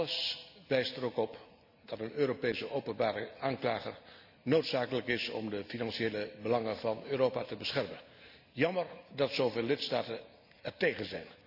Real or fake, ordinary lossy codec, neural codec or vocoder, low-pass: real; none; none; 5.4 kHz